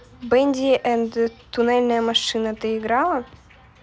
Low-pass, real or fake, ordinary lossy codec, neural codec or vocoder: none; real; none; none